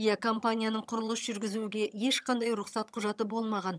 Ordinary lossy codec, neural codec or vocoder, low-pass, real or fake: none; vocoder, 22.05 kHz, 80 mel bands, HiFi-GAN; none; fake